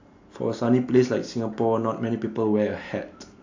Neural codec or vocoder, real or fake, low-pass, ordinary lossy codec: none; real; 7.2 kHz; AAC, 48 kbps